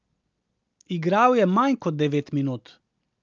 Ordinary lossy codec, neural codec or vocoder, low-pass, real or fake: Opus, 24 kbps; none; 7.2 kHz; real